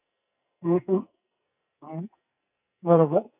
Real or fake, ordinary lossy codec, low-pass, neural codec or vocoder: fake; none; 3.6 kHz; codec, 44.1 kHz, 2.6 kbps, SNAC